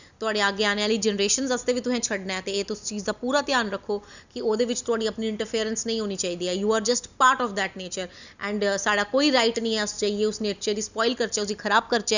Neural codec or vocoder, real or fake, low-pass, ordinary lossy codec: none; real; 7.2 kHz; none